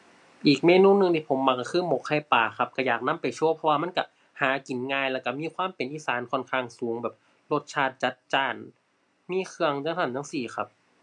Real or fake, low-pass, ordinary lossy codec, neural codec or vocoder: real; 10.8 kHz; MP3, 64 kbps; none